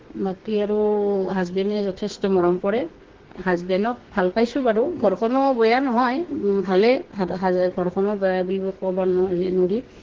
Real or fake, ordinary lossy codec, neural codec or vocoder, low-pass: fake; Opus, 16 kbps; codec, 32 kHz, 1.9 kbps, SNAC; 7.2 kHz